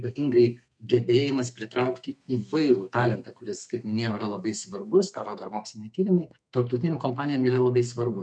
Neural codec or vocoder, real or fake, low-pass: codec, 32 kHz, 1.9 kbps, SNAC; fake; 9.9 kHz